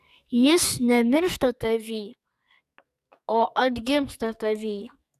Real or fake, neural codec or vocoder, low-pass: fake; codec, 44.1 kHz, 2.6 kbps, SNAC; 14.4 kHz